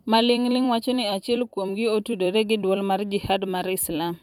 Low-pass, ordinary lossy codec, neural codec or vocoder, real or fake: 19.8 kHz; none; vocoder, 44.1 kHz, 128 mel bands every 256 samples, BigVGAN v2; fake